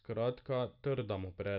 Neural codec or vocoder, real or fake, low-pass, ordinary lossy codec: none; real; 5.4 kHz; none